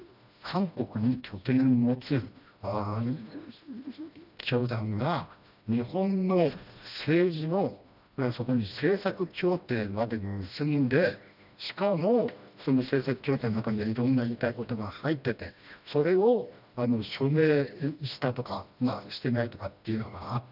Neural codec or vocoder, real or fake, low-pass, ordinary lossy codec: codec, 16 kHz, 1 kbps, FreqCodec, smaller model; fake; 5.4 kHz; none